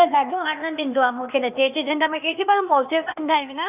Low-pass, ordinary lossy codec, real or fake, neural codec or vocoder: 3.6 kHz; none; fake; codec, 16 kHz, 0.8 kbps, ZipCodec